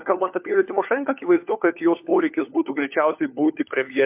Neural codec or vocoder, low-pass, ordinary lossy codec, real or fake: codec, 16 kHz, 4 kbps, FunCodec, trained on LibriTTS, 50 frames a second; 3.6 kHz; MP3, 32 kbps; fake